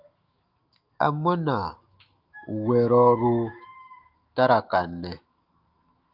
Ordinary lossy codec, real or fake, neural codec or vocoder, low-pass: Opus, 24 kbps; fake; autoencoder, 48 kHz, 128 numbers a frame, DAC-VAE, trained on Japanese speech; 5.4 kHz